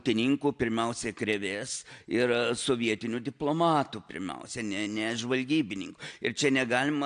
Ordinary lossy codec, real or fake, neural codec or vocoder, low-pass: AAC, 64 kbps; real; none; 9.9 kHz